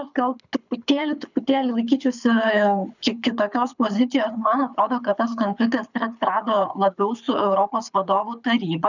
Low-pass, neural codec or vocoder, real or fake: 7.2 kHz; codec, 24 kHz, 6 kbps, HILCodec; fake